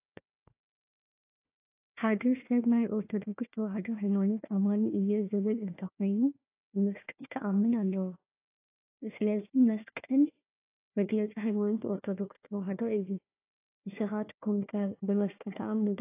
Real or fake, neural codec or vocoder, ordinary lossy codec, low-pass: fake; codec, 16 kHz, 1 kbps, FunCodec, trained on Chinese and English, 50 frames a second; AAC, 24 kbps; 3.6 kHz